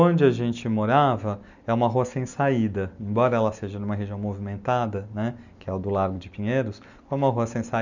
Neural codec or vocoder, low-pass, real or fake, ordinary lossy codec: none; 7.2 kHz; real; none